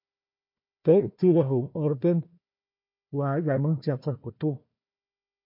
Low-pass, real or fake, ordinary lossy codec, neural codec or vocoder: 5.4 kHz; fake; MP3, 32 kbps; codec, 16 kHz, 1 kbps, FunCodec, trained on Chinese and English, 50 frames a second